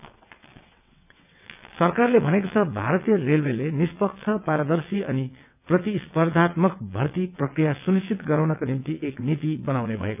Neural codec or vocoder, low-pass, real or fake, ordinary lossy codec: vocoder, 22.05 kHz, 80 mel bands, WaveNeXt; 3.6 kHz; fake; none